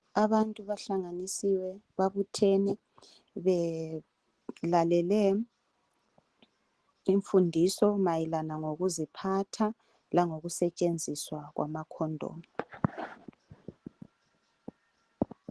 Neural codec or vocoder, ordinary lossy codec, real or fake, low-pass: none; Opus, 16 kbps; real; 10.8 kHz